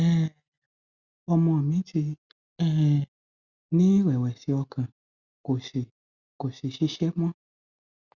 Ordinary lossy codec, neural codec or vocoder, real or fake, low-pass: Opus, 64 kbps; none; real; 7.2 kHz